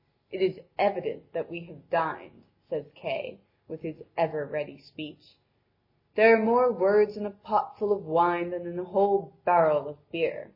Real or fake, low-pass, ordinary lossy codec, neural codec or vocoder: real; 5.4 kHz; MP3, 24 kbps; none